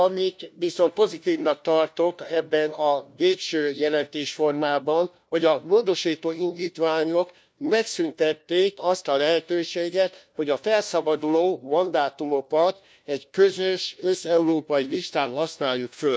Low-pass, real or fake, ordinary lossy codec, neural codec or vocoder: none; fake; none; codec, 16 kHz, 1 kbps, FunCodec, trained on LibriTTS, 50 frames a second